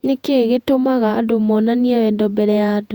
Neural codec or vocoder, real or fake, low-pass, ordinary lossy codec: vocoder, 48 kHz, 128 mel bands, Vocos; fake; 19.8 kHz; Opus, 64 kbps